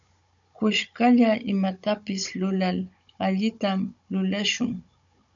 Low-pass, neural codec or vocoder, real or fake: 7.2 kHz; codec, 16 kHz, 16 kbps, FunCodec, trained on Chinese and English, 50 frames a second; fake